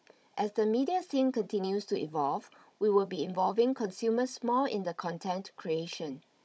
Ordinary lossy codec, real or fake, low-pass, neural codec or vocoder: none; fake; none; codec, 16 kHz, 16 kbps, FunCodec, trained on Chinese and English, 50 frames a second